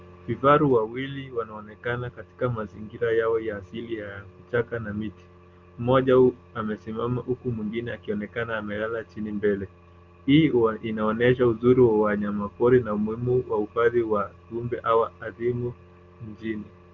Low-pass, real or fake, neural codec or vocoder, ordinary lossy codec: 7.2 kHz; real; none; Opus, 32 kbps